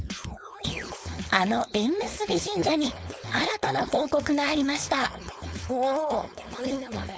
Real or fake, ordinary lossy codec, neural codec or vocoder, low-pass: fake; none; codec, 16 kHz, 4.8 kbps, FACodec; none